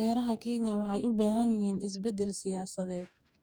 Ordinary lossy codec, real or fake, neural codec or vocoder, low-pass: none; fake; codec, 44.1 kHz, 2.6 kbps, DAC; none